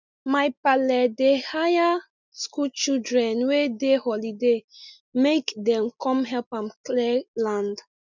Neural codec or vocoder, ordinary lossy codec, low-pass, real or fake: none; none; 7.2 kHz; real